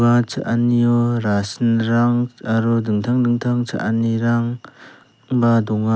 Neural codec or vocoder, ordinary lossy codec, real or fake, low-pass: none; none; real; none